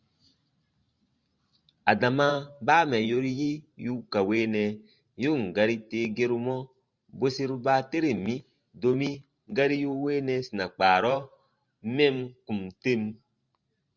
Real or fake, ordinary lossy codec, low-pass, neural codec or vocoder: fake; Opus, 64 kbps; 7.2 kHz; vocoder, 44.1 kHz, 128 mel bands every 512 samples, BigVGAN v2